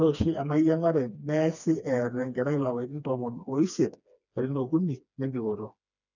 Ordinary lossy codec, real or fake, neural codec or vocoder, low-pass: none; fake; codec, 16 kHz, 2 kbps, FreqCodec, smaller model; 7.2 kHz